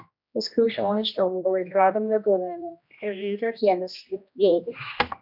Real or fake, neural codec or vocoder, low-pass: fake; codec, 16 kHz, 1 kbps, X-Codec, HuBERT features, trained on general audio; 5.4 kHz